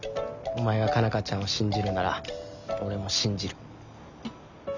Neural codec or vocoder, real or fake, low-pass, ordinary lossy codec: none; real; 7.2 kHz; none